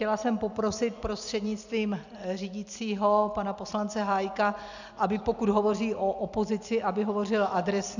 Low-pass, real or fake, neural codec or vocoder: 7.2 kHz; real; none